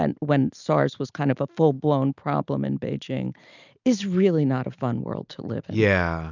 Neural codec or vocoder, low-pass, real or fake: none; 7.2 kHz; real